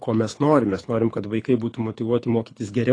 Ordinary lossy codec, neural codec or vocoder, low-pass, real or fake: AAC, 32 kbps; codec, 24 kHz, 6 kbps, HILCodec; 9.9 kHz; fake